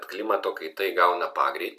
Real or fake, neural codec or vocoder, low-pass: real; none; 14.4 kHz